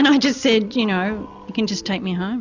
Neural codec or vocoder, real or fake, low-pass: none; real; 7.2 kHz